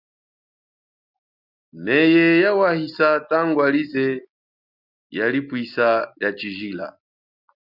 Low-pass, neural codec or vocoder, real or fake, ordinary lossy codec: 5.4 kHz; none; real; Opus, 64 kbps